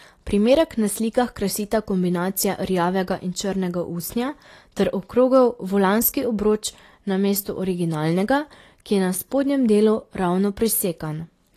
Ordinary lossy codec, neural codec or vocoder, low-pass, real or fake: AAC, 48 kbps; none; 14.4 kHz; real